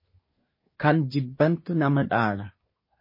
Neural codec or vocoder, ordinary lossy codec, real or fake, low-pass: codec, 24 kHz, 1 kbps, SNAC; MP3, 24 kbps; fake; 5.4 kHz